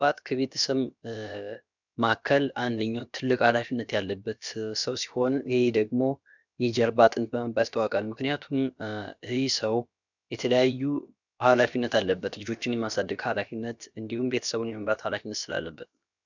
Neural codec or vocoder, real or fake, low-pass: codec, 16 kHz, about 1 kbps, DyCAST, with the encoder's durations; fake; 7.2 kHz